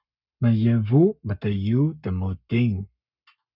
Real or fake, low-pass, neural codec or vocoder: fake; 5.4 kHz; codec, 44.1 kHz, 7.8 kbps, Pupu-Codec